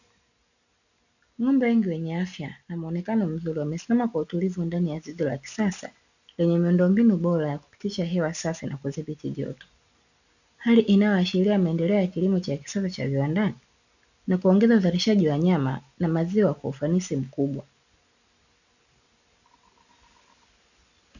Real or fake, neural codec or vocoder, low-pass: real; none; 7.2 kHz